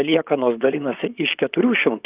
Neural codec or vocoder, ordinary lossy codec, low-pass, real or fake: vocoder, 44.1 kHz, 128 mel bands, Pupu-Vocoder; Opus, 24 kbps; 3.6 kHz; fake